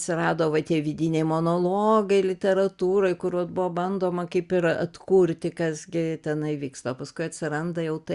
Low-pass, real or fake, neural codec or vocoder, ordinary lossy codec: 10.8 kHz; real; none; Opus, 64 kbps